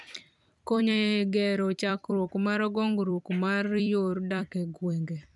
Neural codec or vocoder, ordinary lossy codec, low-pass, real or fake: vocoder, 44.1 kHz, 128 mel bands every 256 samples, BigVGAN v2; none; 10.8 kHz; fake